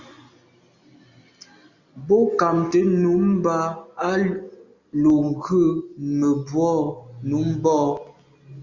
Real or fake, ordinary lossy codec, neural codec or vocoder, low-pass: real; Opus, 64 kbps; none; 7.2 kHz